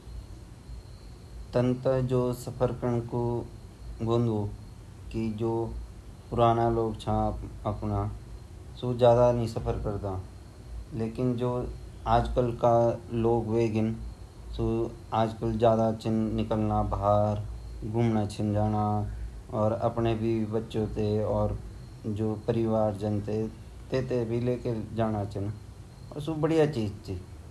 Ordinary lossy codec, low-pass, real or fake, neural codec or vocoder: none; none; real; none